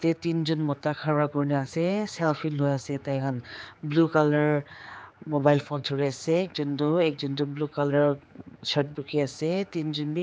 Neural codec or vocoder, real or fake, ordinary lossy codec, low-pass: codec, 16 kHz, 4 kbps, X-Codec, HuBERT features, trained on general audio; fake; none; none